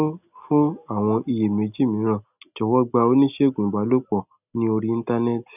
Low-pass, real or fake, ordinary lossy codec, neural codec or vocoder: 3.6 kHz; real; none; none